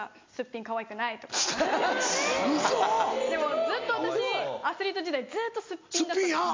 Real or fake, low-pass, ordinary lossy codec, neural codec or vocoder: real; 7.2 kHz; MP3, 64 kbps; none